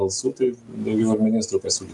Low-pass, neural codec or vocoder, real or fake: 10.8 kHz; none; real